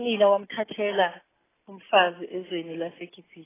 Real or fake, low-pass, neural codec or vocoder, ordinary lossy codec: real; 3.6 kHz; none; AAC, 16 kbps